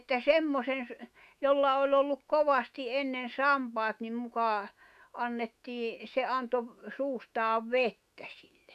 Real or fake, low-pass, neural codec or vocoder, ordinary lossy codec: fake; 14.4 kHz; autoencoder, 48 kHz, 128 numbers a frame, DAC-VAE, trained on Japanese speech; none